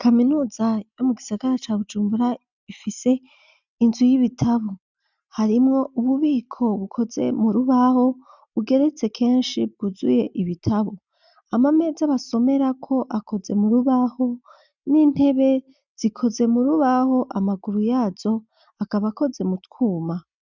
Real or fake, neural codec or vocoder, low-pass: real; none; 7.2 kHz